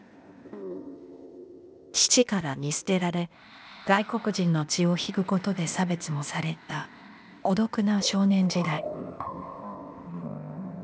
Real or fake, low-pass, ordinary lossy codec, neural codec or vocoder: fake; none; none; codec, 16 kHz, 0.8 kbps, ZipCodec